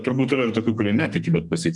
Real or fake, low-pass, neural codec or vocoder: fake; 10.8 kHz; codec, 32 kHz, 1.9 kbps, SNAC